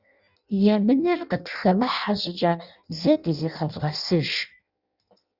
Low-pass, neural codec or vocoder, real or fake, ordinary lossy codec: 5.4 kHz; codec, 16 kHz in and 24 kHz out, 0.6 kbps, FireRedTTS-2 codec; fake; Opus, 64 kbps